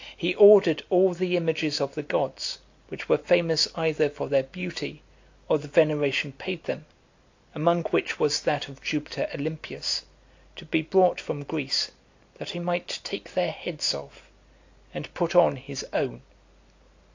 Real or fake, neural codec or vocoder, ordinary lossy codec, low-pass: real; none; AAC, 48 kbps; 7.2 kHz